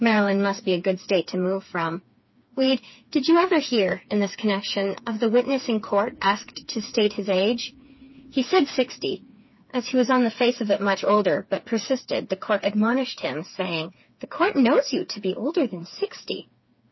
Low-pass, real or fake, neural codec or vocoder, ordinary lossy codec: 7.2 kHz; fake; codec, 16 kHz, 4 kbps, FreqCodec, smaller model; MP3, 24 kbps